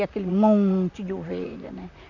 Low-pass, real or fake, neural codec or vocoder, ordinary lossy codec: 7.2 kHz; fake; vocoder, 44.1 kHz, 128 mel bands, Pupu-Vocoder; none